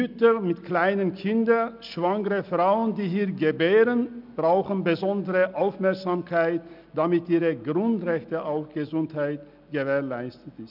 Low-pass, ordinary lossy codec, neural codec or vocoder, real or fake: 5.4 kHz; none; none; real